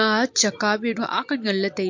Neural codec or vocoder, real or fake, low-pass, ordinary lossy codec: none; real; 7.2 kHz; MP3, 48 kbps